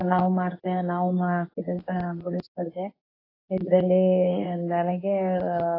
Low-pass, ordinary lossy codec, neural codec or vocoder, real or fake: 5.4 kHz; AAC, 24 kbps; codec, 24 kHz, 0.9 kbps, WavTokenizer, medium speech release version 2; fake